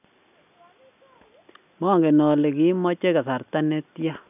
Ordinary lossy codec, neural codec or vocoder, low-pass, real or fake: none; none; 3.6 kHz; real